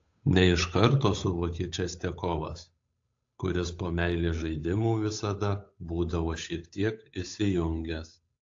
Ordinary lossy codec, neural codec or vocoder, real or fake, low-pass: AAC, 48 kbps; codec, 16 kHz, 8 kbps, FunCodec, trained on Chinese and English, 25 frames a second; fake; 7.2 kHz